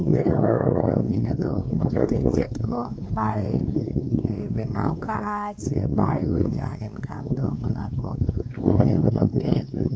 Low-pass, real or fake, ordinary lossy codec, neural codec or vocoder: none; fake; none; codec, 16 kHz, 2 kbps, X-Codec, WavLM features, trained on Multilingual LibriSpeech